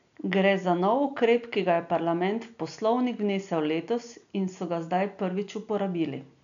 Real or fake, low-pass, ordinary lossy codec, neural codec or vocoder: real; 7.2 kHz; none; none